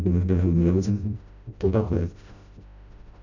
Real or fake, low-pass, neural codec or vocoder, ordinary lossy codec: fake; 7.2 kHz; codec, 16 kHz, 0.5 kbps, FreqCodec, smaller model; none